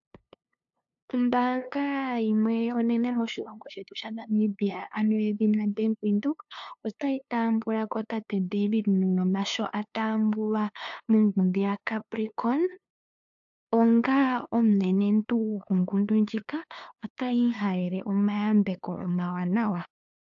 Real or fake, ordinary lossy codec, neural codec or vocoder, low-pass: fake; AAC, 64 kbps; codec, 16 kHz, 2 kbps, FunCodec, trained on LibriTTS, 25 frames a second; 7.2 kHz